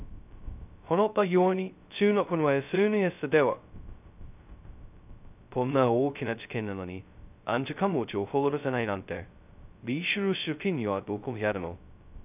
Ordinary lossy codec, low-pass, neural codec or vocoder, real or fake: none; 3.6 kHz; codec, 16 kHz, 0.2 kbps, FocalCodec; fake